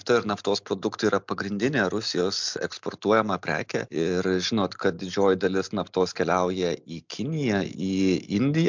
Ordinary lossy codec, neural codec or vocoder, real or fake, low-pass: MP3, 64 kbps; none; real; 7.2 kHz